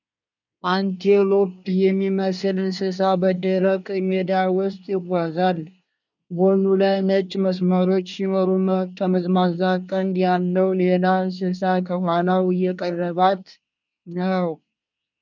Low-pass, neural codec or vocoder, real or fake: 7.2 kHz; codec, 24 kHz, 1 kbps, SNAC; fake